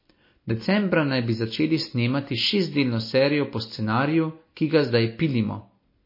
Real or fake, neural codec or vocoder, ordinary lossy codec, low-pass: real; none; MP3, 24 kbps; 5.4 kHz